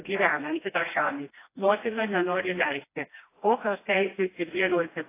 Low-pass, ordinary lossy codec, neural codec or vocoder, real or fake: 3.6 kHz; AAC, 24 kbps; codec, 16 kHz, 1 kbps, FreqCodec, smaller model; fake